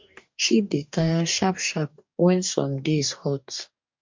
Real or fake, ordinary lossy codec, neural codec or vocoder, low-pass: fake; MP3, 48 kbps; codec, 44.1 kHz, 2.6 kbps, DAC; 7.2 kHz